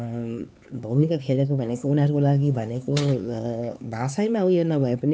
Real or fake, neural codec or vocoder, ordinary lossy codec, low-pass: fake; codec, 16 kHz, 4 kbps, X-Codec, HuBERT features, trained on LibriSpeech; none; none